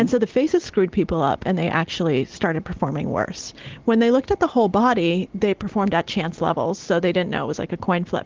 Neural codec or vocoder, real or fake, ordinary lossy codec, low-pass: none; real; Opus, 16 kbps; 7.2 kHz